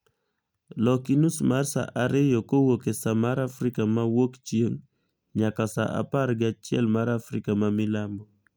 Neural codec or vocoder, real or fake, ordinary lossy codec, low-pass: none; real; none; none